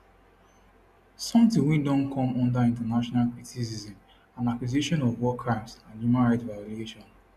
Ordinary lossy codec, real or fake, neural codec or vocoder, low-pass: none; real; none; 14.4 kHz